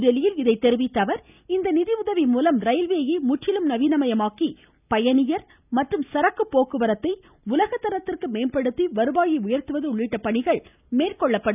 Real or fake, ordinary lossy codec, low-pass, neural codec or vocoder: real; none; 3.6 kHz; none